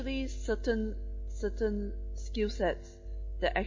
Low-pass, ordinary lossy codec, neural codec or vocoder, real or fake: 7.2 kHz; MP3, 32 kbps; none; real